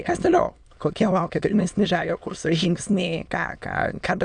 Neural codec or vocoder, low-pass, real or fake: autoencoder, 22.05 kHz, a latent of 192 numbers a frame, VITS, trained on many speakers; 9.9 kHz; fake